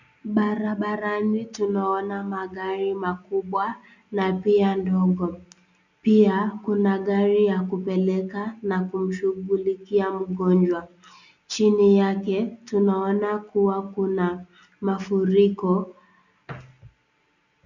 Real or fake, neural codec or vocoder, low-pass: real; none; 7.2 kHz